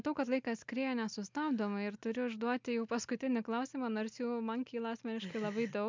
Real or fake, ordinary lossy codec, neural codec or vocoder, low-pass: real; MP3, 64 kbps; none; 7.2 kHz